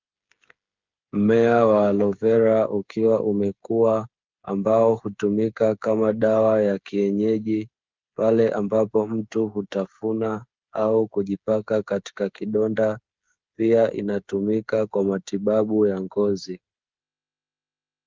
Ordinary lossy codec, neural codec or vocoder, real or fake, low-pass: Opus, 32 kbps; codec, 16 kHz, 8 kbps, FreqCodec, smaller model; fake; 7.2 kHz